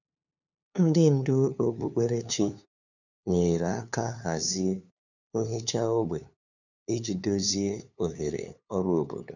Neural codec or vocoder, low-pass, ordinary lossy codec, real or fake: codec, 16 kHz, 2 kbps, FunCodec, trained on LibriTTS, 25 frames a second; 7.2 kHz; none; fake